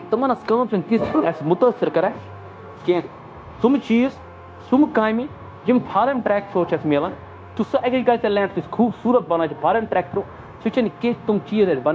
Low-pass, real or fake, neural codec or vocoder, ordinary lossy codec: none; fake; codec, 16 kHz, 0.9 kbps, LongCat-Audio-Codec; none